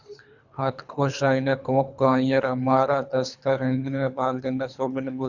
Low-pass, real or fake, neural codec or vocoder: 7.2 kHz; fake; codec, 24 kHz, 3 kbps, HILCodec